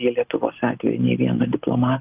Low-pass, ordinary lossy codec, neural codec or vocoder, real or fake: 3.6 kHz; Opus, 24 kbps; none; real